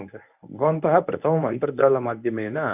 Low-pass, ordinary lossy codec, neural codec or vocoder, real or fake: 3.6 kHz; none; codec, 24 kHz, 0.9 kbps, WavTokenizer, medium speech release version 1; fake